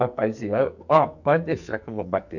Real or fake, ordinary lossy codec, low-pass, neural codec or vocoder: fake; none; 7.2 kHz; codec, 44.1 kHz, 2.6 kbps, SNAC